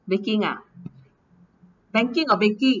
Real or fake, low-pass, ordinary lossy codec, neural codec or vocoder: real; 7.2 kHz; none; none